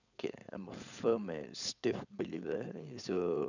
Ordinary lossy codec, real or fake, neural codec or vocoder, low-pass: none; fake; codec, 16 kHz, 4.8 kbps, FACodec; 7.2 kHz